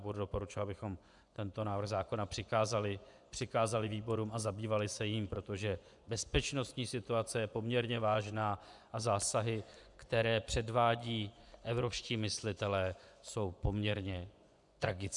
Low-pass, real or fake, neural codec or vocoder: 10.8 kHz; fake; vocoder, 24 kHz, 100 mel bands, Vocos